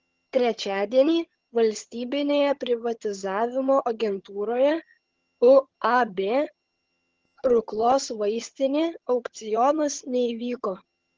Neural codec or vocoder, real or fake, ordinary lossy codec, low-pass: vocoder, 22.05 kHz, 80 mel bands, HiFi-GAN; fake; Opus, 16 kbps; 7.2 kHz